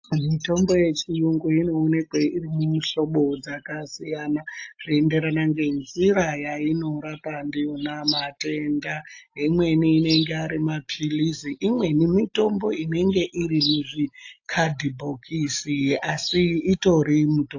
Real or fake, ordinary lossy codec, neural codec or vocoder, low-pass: real; AAC, 48 kbps; none; 7.2 kHz